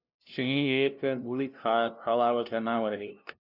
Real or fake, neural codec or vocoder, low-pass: fake; codec, 16 kHz, 0.5 kbps, FunCodec, trained on LibriTTS, 25 frames a second; 5.4 kHz